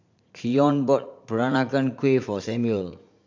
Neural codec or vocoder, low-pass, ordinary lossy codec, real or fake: vocoder, 44.1 kHz, 80 mel bands, Vocos; 7.2 kHz; MP3, 64 kbps; fake